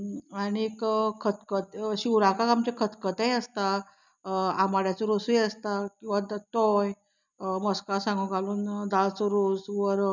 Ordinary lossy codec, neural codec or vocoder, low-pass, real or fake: none; none; 7.2 kHz; real